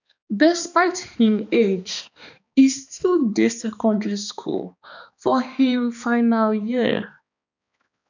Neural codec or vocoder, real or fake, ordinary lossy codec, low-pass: codec, 16 kHz, 2 kbps, X-Codec, HuBERT features, trained on balanced general audio; fake; none; 7.2 kHz